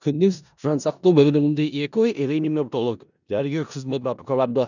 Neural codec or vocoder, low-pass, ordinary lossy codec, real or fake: codec, 16 kHz in and 24 kHz out, 0.4 kbps, LongCat-Audio-Codec, four codebook decoder; 7.2 kHz; none; fake